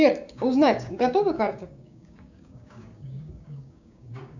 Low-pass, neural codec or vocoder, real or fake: 7.2 kHz; codec, 16 kHz, 6 kbps, DAC; fake